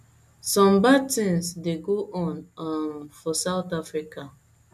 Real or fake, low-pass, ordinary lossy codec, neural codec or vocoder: real; 14.4 kHz; none; none